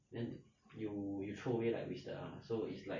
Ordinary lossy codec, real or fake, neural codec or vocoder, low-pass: MP3, 32 kbps; real; none; 7.2 kHz